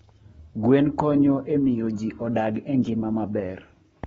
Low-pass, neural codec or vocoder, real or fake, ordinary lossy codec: 19.8 kHz; codec, 44.1 kHz, 7.8 kbps, Pupu-Codec; fake; AAC, 24 kbps